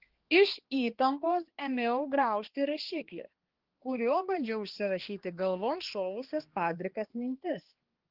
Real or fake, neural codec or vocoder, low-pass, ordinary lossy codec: fake; codec, 16 kHz, 2 kbps, X-Codec, HuBERT features, trained on balanced general audio; 5.4 kHz; Opus, 16 kbps